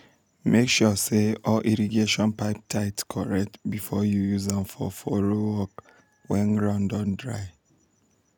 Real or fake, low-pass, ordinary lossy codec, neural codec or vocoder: real; none; none; none